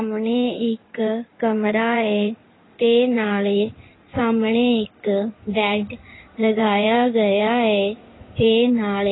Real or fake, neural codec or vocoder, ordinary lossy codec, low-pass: fake; codec, 16 kHz in and 24 kHz out, 2.2 kbps, FireRedTTS-2 codec; AAC, 16 kbps; 7.2 kHz